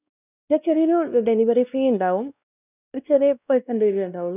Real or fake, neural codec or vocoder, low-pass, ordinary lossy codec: fake; codec, 16 kHz, 1 kbps, X-Codec, WavLM features, trained on Multilingual LibriSpeech; 3.6 kHz; none